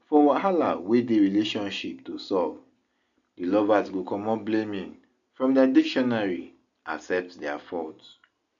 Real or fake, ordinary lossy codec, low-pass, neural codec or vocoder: real; none; 7.2 kHz; none